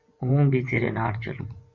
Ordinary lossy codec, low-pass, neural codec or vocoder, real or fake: Opus, 64 kbps; 7.2 kHz; vocoder, 24 kHz, 100 mel bands, Vocos; fake